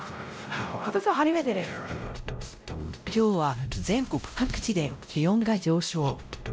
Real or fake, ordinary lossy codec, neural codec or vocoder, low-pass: fake; none; codec, 16 kHz, 0.5 kbps, X-Codec, WavLM features, trained on Multilingual LibriSpeech; none